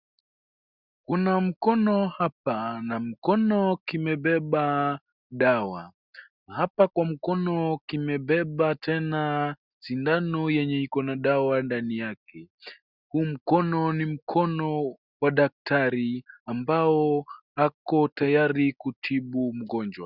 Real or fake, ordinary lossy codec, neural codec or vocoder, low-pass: real; Opus, 64 kbps; none; 5.4 kHz